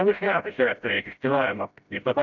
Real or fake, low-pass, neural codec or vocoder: fake; 7.2 kHz; codec, 16 kHz, 0.5 kbps, FreqCodec, smaller model